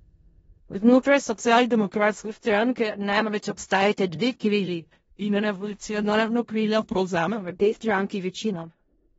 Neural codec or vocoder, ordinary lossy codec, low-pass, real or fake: codec, 16 kHz in and 24 kHz out, 0.4 kbps, LongCat-Audio-Codec, four codebook decoder; AAC, 24 kbps; 10.8 kHz; fake